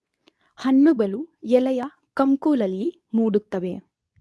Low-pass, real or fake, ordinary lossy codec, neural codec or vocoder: none; fake; none; codec, 24 kHz, 0.9 kbps, WavTokenizer, medium speech release version 2